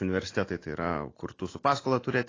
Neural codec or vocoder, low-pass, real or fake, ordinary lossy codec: none; 7.2 kHz; real; AAC, 32 kbps